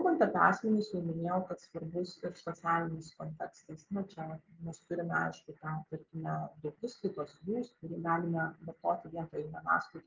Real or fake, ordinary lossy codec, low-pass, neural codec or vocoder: real; Opus, 32 kbps; 7.2 kHz; none